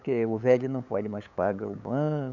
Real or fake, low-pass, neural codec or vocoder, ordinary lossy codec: fake; 7.2 kHz; codec, 16 kHz, 8 kbps, FunCodec, trained on LibriTTS, 25 frames a second; none